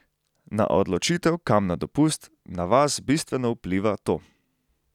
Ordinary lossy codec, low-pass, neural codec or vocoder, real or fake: none; 19.8 kHz; none; real